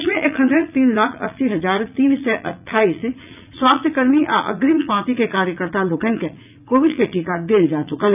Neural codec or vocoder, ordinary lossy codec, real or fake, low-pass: vocoder, 44.1 kHz, 80 mel bands, Vocos; none; fake; 3.6 kHz